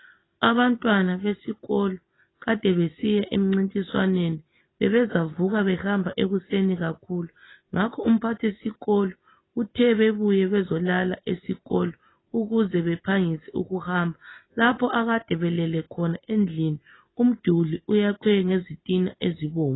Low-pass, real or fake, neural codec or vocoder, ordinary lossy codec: 7.2 kHz; real; none; AAC, 16 kbps